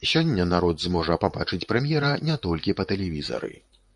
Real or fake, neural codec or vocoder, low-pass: fake; vocoder, 44.1 kHz, 128 mel bands, Pupu-Vocoder; 10.8 kHz